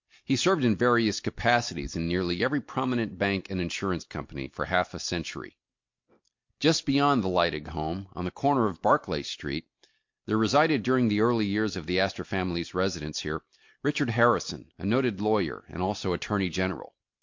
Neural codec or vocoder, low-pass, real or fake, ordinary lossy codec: none; 7.2 kHz; real; MP3, 48 kbps